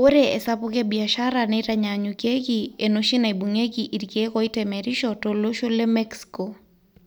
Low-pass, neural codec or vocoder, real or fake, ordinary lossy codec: none; none; real; none